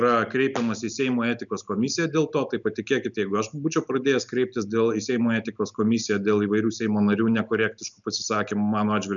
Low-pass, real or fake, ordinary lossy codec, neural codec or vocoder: 7.2 kHz; real; MP3, 96 kbps; none